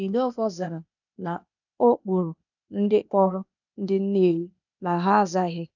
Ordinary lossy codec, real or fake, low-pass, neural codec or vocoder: none; fake; 7.2 kHz; codec, 16 kHz, 0.8 kbps, ZipCodec